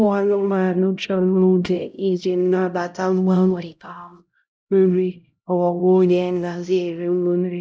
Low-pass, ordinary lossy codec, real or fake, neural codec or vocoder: none; none; fake; codec, 16 kHz, 0.5 kbps, X-Codec, HuBERT features, trained on LibriSpeech